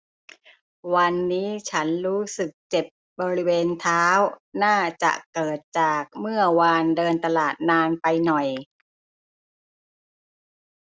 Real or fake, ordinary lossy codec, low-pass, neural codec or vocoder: real; none; none; none